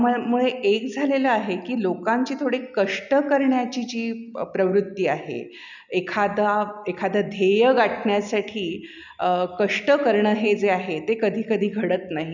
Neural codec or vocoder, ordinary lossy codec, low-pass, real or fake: none; none; 7.2 kHz; real